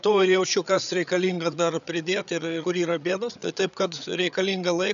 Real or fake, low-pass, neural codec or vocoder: fake; 7.2 kHz; codec, 16 kHz, 8 kbps, FreqCodec, larger model